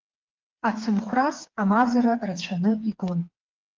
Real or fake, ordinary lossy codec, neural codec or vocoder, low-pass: fake; Opus, 32 kbps; codec, 16 kHz, 4 kbps, FreqCodec, smaller model; 7.2 kHz